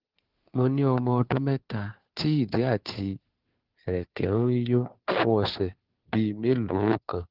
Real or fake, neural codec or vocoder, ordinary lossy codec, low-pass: fake; codec, 16 kHz, 2 kbps, FunCodec, trained on Chinese and English, 25 frames a second; Opus, 16 kbps; 5.4 kHz